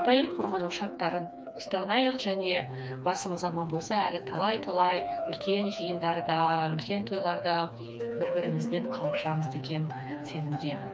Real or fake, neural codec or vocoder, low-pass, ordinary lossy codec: fake; codec, 16 kHz, 2 kbps, FreqCodec, smaller model; none; none